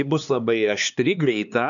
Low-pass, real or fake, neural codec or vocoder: 7.2 kHz; fake; codec, 16 kHz, 2 kbps, X-Codec, HuBERT features, trained on LibriSpeech